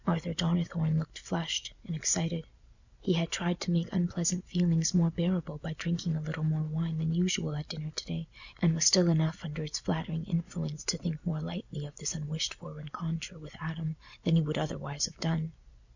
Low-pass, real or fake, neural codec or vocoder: 7.2 kHz; real; none